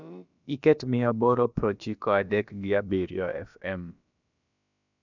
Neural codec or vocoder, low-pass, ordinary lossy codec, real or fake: codec, 16 kHz, about 1 kbps, DyCAST, with the encoder's durations; 7.2 kHz; none; fake